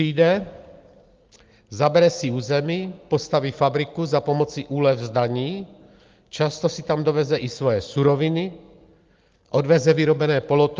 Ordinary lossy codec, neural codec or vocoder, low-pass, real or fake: Opus, 32 kbps; none; 7.2 kHz; real